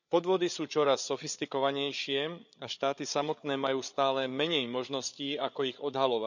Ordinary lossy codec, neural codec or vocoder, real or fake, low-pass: none; codec, 16 kHz, 8 kbps, FreqCodec, larger model; fake; 7.2 kHz